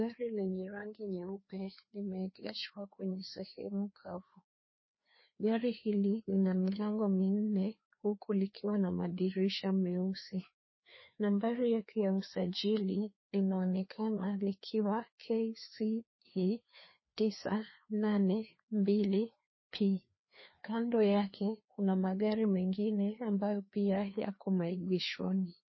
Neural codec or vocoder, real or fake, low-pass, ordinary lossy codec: codec, 16 kHz, 2 kbps, FreqCodec, larger model; fake; 7.2 kHz; MP3, 24 kbps